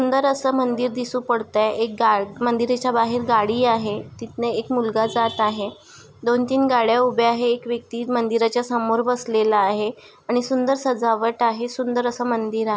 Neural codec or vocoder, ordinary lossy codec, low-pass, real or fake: none; none; none; real